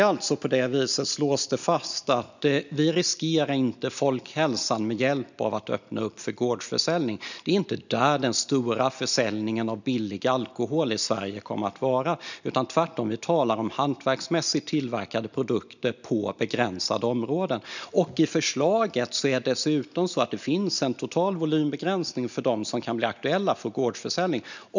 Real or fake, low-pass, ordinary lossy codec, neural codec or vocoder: real; 7.2 kHz; none; none